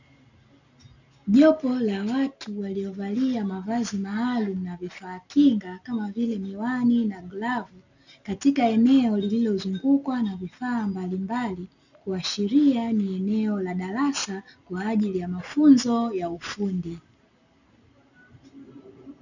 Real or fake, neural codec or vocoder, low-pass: real; none; 7.2 kHz